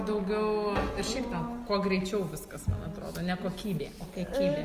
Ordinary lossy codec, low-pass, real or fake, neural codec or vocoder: Opus, 32 kbps; 14.4 kHz; real; none